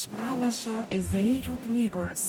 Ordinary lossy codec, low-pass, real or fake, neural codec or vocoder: MP3, 96 kbps; 19.8 kHz; fake; codec, 44.1 kHz, 0.9 kbps, DAC